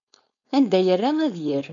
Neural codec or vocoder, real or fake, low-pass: codec, 16 kHz, 4.8 kbps, FACodec; fake; 7.2 kHz